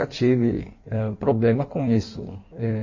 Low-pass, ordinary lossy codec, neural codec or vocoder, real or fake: 7.2 kHz; MP3, 32 kbps; codec, 16 kHz in and 24 kHz out, 1.1 kbps, FireRedTTS-2 codec; fake